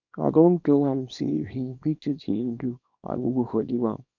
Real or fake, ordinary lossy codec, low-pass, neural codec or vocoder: fake; none; 7.2 kHz; codec, 24 kHz, 0.9 kbps, WavTokenizer, small release